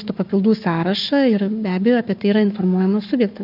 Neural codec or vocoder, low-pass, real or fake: codec, 44.1 kHz, 7.8 kbps, Pupu-Codec; 5.4 kHz; fake